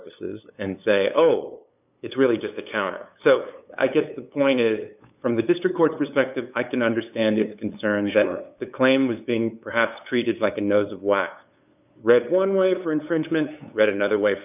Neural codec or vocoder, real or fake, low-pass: codec, 16 kHz, 8 kbps, FunCodec, trained on LibriTTS, 25 frames a second; fake; 3.6 kHz